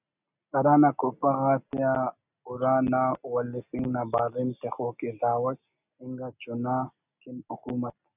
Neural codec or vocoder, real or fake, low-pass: none; real; 3.6 kHz